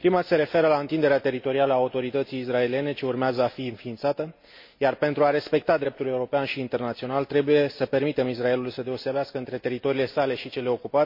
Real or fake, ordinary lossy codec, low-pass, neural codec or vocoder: real; MP3, 32 kbps; 5.4 kHz; none